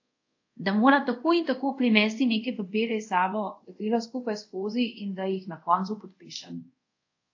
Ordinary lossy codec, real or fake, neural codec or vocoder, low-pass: AAC, 48 kbps; fake; codec, 24 kHz, 0.5 kbps, DualCodec; 7.2 kHz